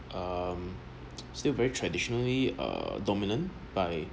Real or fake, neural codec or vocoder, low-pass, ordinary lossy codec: real; none; none; none